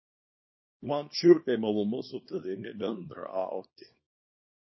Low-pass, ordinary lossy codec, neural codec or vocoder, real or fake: 7.2 kHz; MP3, 24 kbps; codec, 24 kHz, 0.9 kbps, WavTokenizer, small release; fake